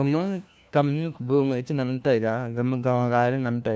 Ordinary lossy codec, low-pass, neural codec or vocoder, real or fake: none; none; codec, 16 kHz, 1 kbps, FunCodec, trained on LibriTTS, 50 frames a second; fake